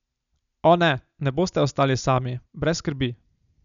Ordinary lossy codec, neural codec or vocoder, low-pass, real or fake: none; none; 7.2 kHz; real